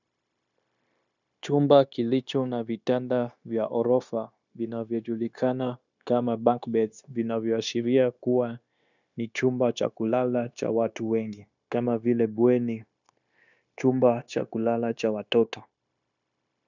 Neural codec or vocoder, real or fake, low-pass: codec, 16 kHz, 0.9 kbps, LongCat-Audio-Codec; fake; 7.2 kHz